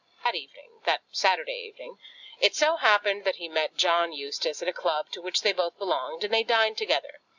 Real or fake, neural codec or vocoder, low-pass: real; none; 7.2 kHz